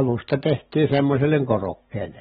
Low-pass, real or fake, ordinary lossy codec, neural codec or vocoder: 19.8 kHz; real; AAC, 16 kbps; none